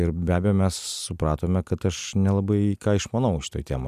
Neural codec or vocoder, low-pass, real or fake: none; 14.4 kHz; real